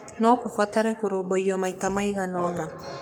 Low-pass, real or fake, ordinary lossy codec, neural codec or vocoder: none; fake; none; codec, 44.1 kHz, 3.4 kbps, Pupu-Codec